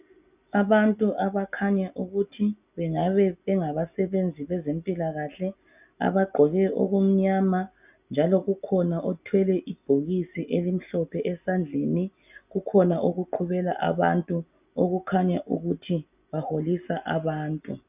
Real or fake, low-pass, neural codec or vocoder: real; 3.6 kHz; none